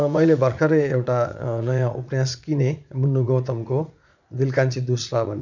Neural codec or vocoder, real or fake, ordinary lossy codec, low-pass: vocoder, 44.1 kHz, 80 mel bands, Vocos; fake; none; 7.2 kHz